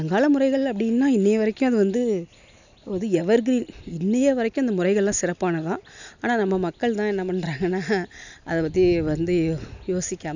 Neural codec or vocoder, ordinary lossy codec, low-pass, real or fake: none; none; 7.2 kHz; real